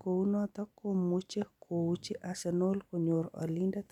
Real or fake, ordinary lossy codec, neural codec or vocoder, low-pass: real; none; none; 19.8 kHz